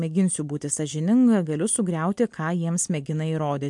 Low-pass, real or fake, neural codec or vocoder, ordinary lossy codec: 10.8 kHz; real; none; MP3, 64 kbps